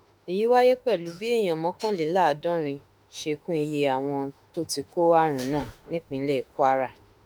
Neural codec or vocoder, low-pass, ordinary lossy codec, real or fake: autoencoder, 48 kHz, 32 numbers a frame, DAC-VAE, trained on Japanese speech; none; none; fake